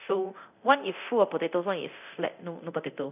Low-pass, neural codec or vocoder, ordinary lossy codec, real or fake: 3.6 kHz; codec, 24 kHz, 0.9 kbps, DualCodec; none; fake